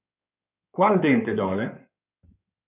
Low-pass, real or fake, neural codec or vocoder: 3.6 kHz; fake; codec, 16 kHz in and 24 kHz out, 2.2 kbps, FireRedTTS-2 codec